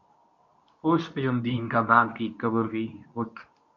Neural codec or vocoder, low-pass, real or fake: codec, 24 kHz, 0.9 kbps, WavTokenizer, medium speech release version 2; 7.2 kHz; fake